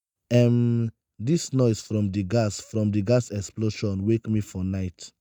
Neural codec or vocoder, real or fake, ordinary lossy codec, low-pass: none; real; none; none